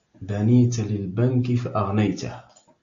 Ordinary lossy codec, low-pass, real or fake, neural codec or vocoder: AAC, 64 kbps; 7.2 kHz; real; none